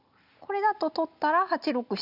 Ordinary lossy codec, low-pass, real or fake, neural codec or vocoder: none; 5.4 kHz; real; none